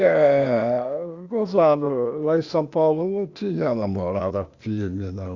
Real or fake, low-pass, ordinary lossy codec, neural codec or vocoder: fake; 7.2 kHz; Opus, 64 kbps; codec, 16 kHz, 0.8 kbps, ZipCodec